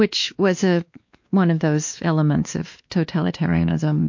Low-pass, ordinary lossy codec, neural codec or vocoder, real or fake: 7.2 kHz; MP3, 48 kbps; codec, 16 kHz, 2 kbps, X-Codec, WavLM features, trained on Multilingual LibriSpeech; fake